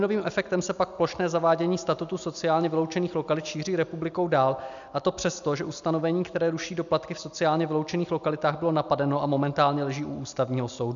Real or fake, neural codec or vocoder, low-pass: real; none; 7.2 kHz